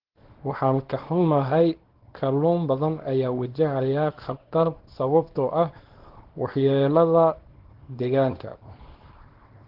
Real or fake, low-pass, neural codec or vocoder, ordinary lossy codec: fake; 5.4 kHz; codec, 24 kHz, 0.9 kbps, WavTokenizer, small release; Opus, 16 kbps